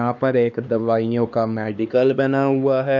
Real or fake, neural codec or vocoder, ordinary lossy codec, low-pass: fake; codec, 16 kHz, 2 kbps, X-Codec, HuBERT features, trained on LibriSpeech; none; 7.2 kHz